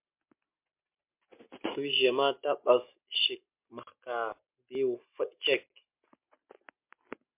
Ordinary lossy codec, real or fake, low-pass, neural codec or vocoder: MP3, 32 kbps; real; 3.6 kHz; none